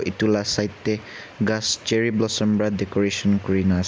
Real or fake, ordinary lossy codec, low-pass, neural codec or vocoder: real; none; none; none